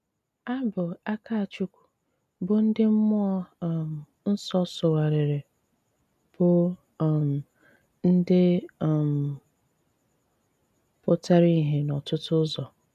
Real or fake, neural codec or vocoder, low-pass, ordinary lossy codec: real; none; 14.4 kHz; none